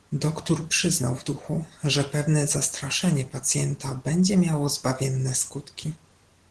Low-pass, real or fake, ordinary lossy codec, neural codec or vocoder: 10.8 kHz; real; Opus, 16 kbps; none